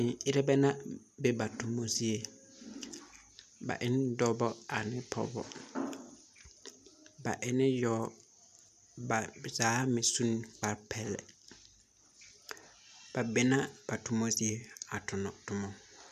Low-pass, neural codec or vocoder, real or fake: 14.4 kHz; none; real